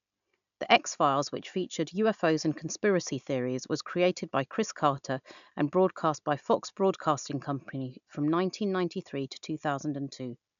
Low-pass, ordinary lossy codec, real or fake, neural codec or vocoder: 7.2 kHz; none; real; none